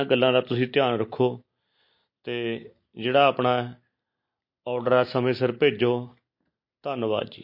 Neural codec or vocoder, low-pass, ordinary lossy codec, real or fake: none; 5.4 kHz; MP3, 32 kbps; real